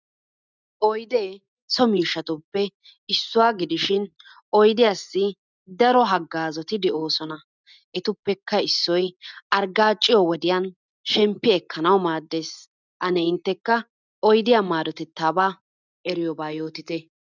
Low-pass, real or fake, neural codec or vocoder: 7.2 kHz; real; none